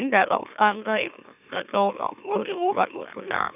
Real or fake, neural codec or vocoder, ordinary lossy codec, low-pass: fake; autoencoder, 44.1 kHz, a latent of 192 numbers a frame, MeloTTS; none; 3.6 kHz